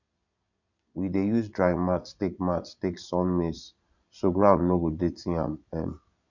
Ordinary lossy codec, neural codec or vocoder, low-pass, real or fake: none; vocoder, 22.05 kHz, 80 mel bands, Vocos; 7.2 kHz; fake